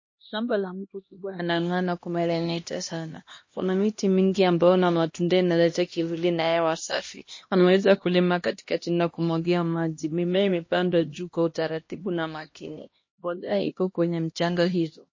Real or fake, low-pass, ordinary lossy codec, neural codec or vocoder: fake; 7.2 kHz; MP3, 32 kbps; codec, 16 kHz, 1 kbps, X-Codec, HuBERT features, trained on LibriSpeech